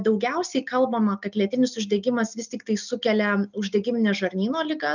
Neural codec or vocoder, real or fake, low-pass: none; real; 7.2 kHz